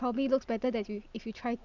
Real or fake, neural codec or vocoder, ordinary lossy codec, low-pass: fake; vocoder, 44.1 kHz, 128 mel bands every 256 samples, BigVGAN v2; none; 7.2 kHz